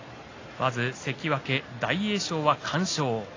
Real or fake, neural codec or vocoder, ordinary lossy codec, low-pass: real; none; AAC, 32 kbps; 7.2 kHz